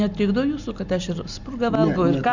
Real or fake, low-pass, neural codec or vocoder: real; 7.2 kHz; none